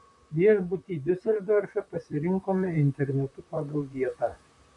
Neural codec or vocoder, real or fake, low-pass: vocoder, 44.1 kHz, 128 mel bands, Pupu-Vocoder; fake; 10.8 kHz